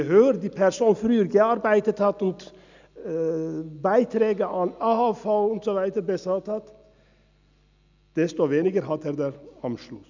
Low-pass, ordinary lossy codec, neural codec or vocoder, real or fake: 7.2 kHz; none; none; real